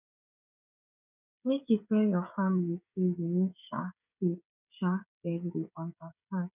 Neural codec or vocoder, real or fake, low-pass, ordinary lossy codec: codec, 16 kHz, 16 kbps, FreqCodec, smaller model; fake; 3.6 kHz; none